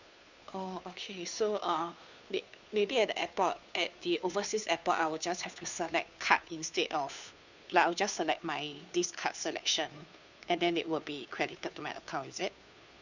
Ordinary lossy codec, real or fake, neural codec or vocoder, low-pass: none; fake; codec, 16 kHz, 2 kbps, FunCodec, trained on Chinese and English, 25 frames a second; 7.2 kHz